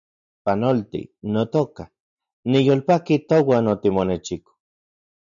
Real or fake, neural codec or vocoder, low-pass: real; none; 7.2 kHz